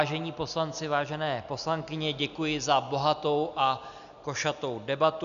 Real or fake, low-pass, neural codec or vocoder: real; 7.2 kHz; none